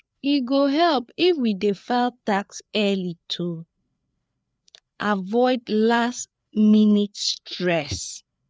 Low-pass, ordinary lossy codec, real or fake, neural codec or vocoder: none; none; fake; codec, 16 kHz, 4 kbps, FreqCodec, larger model